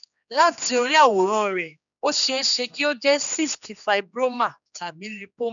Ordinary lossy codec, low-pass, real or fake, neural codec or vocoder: none; 7.2 kHz; fake; codec, 16 kHz, 2 kbps, X-Codec, HuBERT features, trained on general audio